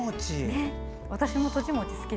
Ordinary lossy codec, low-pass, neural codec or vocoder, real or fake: none; none; none; real